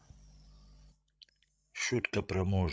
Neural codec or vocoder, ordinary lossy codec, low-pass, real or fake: codec, 16 kHz, 16 kbps, FreqCodec, larger model; none; none; fake